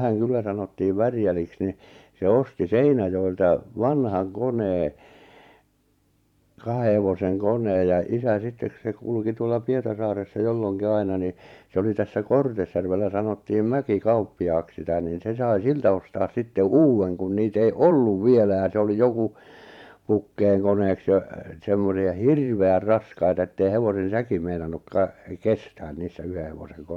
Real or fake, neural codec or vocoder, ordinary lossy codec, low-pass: fake; vocoder, 44.1 kHz, 128 mel bands every 512 samples, BigVGAN v2; MP3, 96 kbps; 19.8 kHz